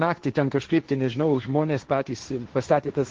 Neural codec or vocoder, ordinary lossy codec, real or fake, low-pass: codec, 16 kHz, 1.1 kbps, Voila-Tokenizer; Opus, 16 kbps; fake; 7.2 kHz